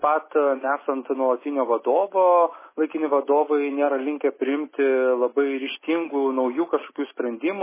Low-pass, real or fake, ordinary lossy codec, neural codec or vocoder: 3.6 kHz; real; MP3, 16 kbps; none